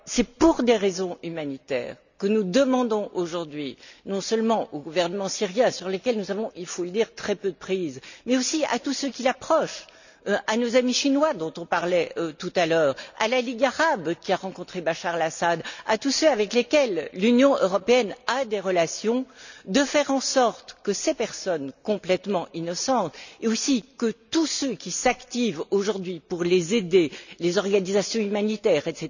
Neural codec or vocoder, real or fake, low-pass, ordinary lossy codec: none; real; 7.2 kHz; none